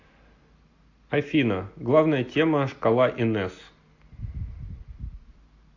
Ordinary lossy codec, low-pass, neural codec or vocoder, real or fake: AAC, 48 kbps; 7.2 kHz; none; real